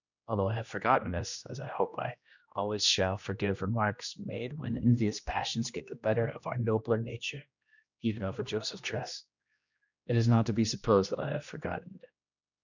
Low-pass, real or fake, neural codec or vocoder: 7.2 kHz; fake; codec, 16 kHz, 1 kbps, X-Codec, HuBERT features, trained on general audio